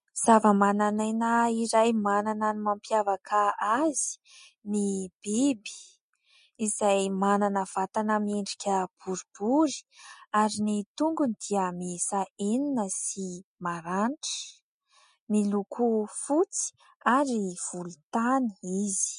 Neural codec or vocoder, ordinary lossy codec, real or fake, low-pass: none; MP3, 48 kbps; real; 14.4 kHz